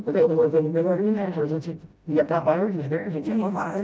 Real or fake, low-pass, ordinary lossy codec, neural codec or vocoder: fake; none; none; codec, 16 kHz, 0.5 kbps, FreqCodec, smaller model